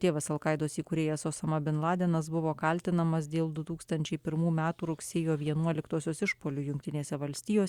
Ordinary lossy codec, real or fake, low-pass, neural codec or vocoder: Opus, 64 kbps; real; 19.8 kHz; none